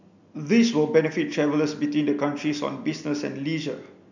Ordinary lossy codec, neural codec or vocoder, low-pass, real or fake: none; none; 7.2 kHz; real